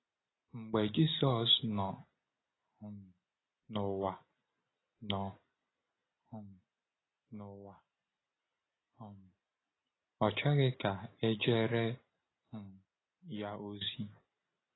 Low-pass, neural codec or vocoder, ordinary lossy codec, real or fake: 7.2 kHz; none; AAC, 16 kbps; real